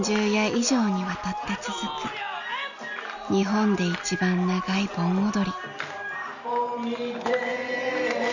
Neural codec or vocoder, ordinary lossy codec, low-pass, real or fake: none; none; 7.2 kHz; real